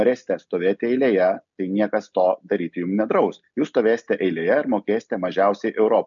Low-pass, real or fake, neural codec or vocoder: 7.2 kHz; real; none